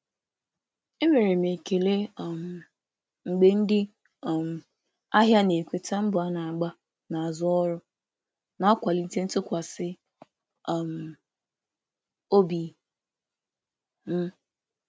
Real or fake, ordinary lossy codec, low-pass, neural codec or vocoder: real; none; none; none